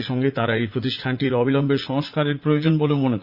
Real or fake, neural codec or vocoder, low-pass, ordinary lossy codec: fake; vocoder, 44.1 kHz, 80 mel bands, Vocos; 5.4 kHz; Opus, 64 kbps